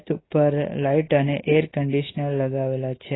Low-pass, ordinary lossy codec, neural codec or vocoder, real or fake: 7.2 kHz; AAC, 16 kbps; none; real